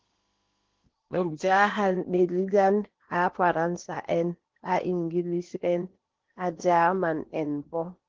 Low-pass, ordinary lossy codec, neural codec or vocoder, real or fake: 7.2 kHz; Opus, 16 kbps; codec, 16 kHz in and 24 kHz out, 0.8 kbps, FocalCodec, streaming, 65536 codes; fake